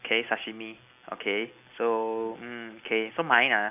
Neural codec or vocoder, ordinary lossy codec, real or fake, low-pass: none; none; real; 3.6 kHz